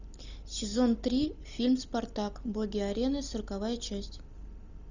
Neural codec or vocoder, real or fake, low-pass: none; real; 7.2 kHz